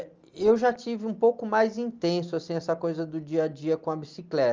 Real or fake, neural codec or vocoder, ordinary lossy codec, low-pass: real; none; Opus, 24 kbps; 7.2 kHz